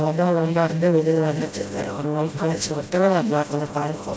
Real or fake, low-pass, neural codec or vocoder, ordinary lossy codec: fake; none; codec, 16 kHz, 0.5 kbps, FreqCodec, smaller model; none